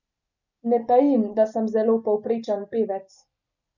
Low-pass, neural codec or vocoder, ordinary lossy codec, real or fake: 7.2 kHz; none; none; real